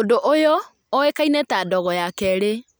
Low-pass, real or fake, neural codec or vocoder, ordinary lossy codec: none; real; none; none